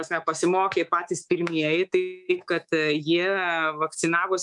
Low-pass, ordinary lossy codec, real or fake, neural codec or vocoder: 10.8 kHz; MP3, 96 kbps; fake; codec, 24 kHz, 3.1 kbps, DualCodec